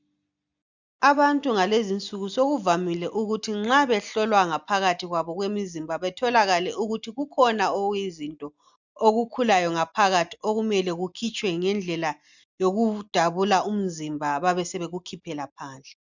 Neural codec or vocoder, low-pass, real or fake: none; 7.2 kHz; real